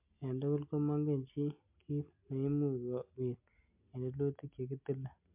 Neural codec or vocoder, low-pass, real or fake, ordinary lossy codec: none; 3.6 kHz; real; MP3, 32 kbps